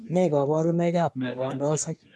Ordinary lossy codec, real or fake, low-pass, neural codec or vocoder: none; fake; none; codec, 24 kHz, 1 kbps, SNAC